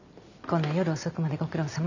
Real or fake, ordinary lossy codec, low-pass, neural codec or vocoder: real; none; 7.2 kHz; none